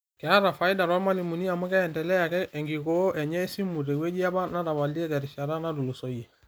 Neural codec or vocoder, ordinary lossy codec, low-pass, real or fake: none; none; none; real